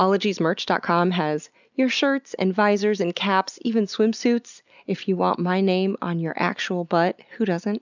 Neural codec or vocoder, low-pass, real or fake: none; 7.2 kHz; real